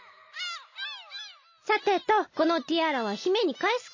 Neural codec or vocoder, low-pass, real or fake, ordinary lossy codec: none; 7.2 kHz; real; MP3, 32 kbps